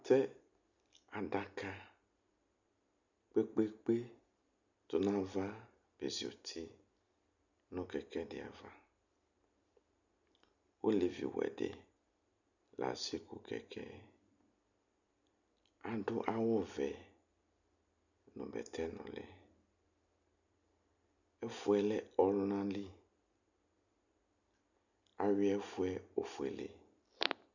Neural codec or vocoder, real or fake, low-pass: none; real; 7.2 kHz